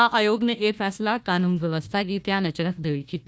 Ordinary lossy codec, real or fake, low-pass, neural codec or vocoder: none; fake; none; codec, 16 kHz, 1 kbps, FunCodec, trained on Chinese and English, 50 frames a second